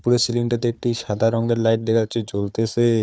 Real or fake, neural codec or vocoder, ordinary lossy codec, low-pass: fake; codec, 16 kHz, 4 kbps, FunCodec, trained on Chinese and English, 50 frames a second; none; none